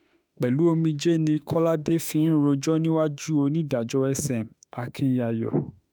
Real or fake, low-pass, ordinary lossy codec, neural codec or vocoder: fake; none; none; autoencoder, 48 kHz, 32 numbers a frame, DAC-VAE, trained on Japanese speech